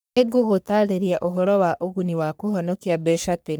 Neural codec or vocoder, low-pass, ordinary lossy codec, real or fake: codec, 44.1 kHz, 3.4 kbps, Pupu-Codec; none; none; fake